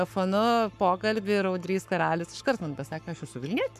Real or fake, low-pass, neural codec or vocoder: fake; 14.4 kHz; codec, 44.1 kHz, 7.8 kbps, Pupu-Codec